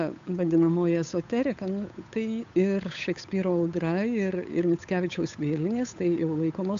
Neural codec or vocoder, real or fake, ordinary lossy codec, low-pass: codec, 16 kHz, 8 kbps, FunCodec, trained on Chinese and English, 25 frames a second; fake; AAC, 64 kbps; 7.2 kHz